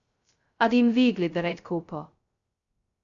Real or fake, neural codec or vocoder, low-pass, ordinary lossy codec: fake; codec, 16 kHz, 0.2 kbps, FocalCodec; 7.2 kHz; Opus, 64 kbps